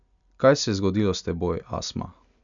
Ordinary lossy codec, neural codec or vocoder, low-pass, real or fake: none; none; 7.2 kHz; real